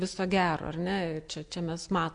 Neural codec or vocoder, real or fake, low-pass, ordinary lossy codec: none; real; 9.9 kHz; AAC, 64 kbps